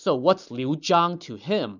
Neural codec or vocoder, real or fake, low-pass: none; real; 7.2 kHz